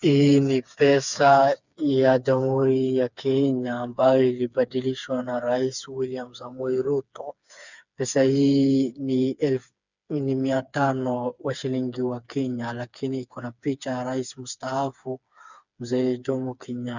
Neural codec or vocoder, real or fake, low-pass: codec, 16 kHz, 4 kbps, FreqCodec, smaller model; fake; 7.2 kHz